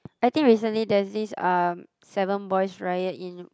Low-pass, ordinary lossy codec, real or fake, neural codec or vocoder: none; none; real; none